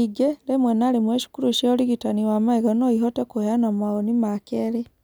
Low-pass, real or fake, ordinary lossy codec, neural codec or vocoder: none; real; none; none